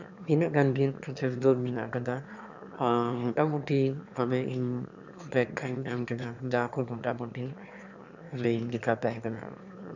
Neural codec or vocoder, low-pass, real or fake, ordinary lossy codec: autoencoder, 22.05 kHz, a latent of 192 numbers a frame, VITS, trained on one speaker; 7.2 kHz; fake; none